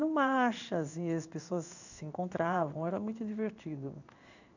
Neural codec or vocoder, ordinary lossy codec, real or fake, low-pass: codec, 16 kHz in and 24 kHz out, 1 kbps, XY-Tokenizer; none; fake; 7.2 kHz